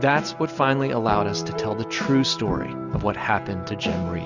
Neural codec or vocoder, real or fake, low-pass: none; real; 7.2 kHz